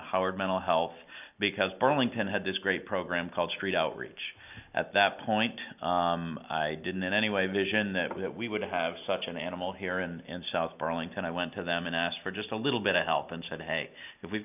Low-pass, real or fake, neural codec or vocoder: 3.6 kHz; real; none